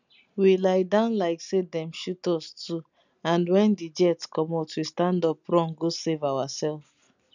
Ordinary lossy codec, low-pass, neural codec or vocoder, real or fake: none; 7.2 kHz; none; real